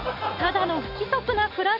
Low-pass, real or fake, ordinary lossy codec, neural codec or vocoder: 5.4 kHz; real; none; none